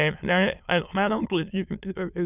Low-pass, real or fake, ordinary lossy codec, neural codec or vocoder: 3.6 kHz; fake; none; autoencoder, 22.05 kHz, a latent of 192 numbers a frame, VITS, trained on many speakers